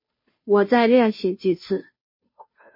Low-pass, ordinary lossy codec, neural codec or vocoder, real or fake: 5.4 kHz; MP3, 24 kbps; codec, 16 kHz, 0.5 kbps, FunCodec, trained on Chinese and English, 25 frames a second; fake